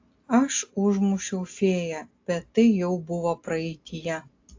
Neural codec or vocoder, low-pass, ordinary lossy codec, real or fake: none; 7.2 kHz; AAC, 48 kbps; real